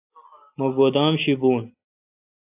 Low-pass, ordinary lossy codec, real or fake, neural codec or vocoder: 3.6 kHz; AAC, 32 kbps; real; none